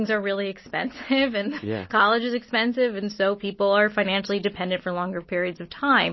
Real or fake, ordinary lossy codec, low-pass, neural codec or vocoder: real; MP3, 24 kbps; 7.2 kHz; none